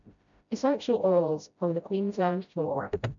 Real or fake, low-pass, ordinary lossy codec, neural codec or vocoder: fake; 7.2 kHz; MP3, 96 kbps; codec, 16 kHz, 0.5 kbps, FreqCodec, smaller model